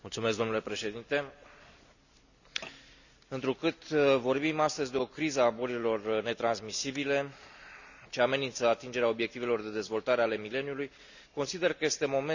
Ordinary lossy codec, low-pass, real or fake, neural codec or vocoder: none; 7.2 kHz; real; none